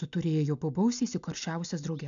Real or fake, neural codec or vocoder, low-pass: real; none; 7.2 kHz